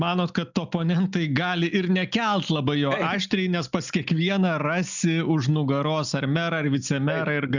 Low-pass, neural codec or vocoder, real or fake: 7.2 kHz; none; real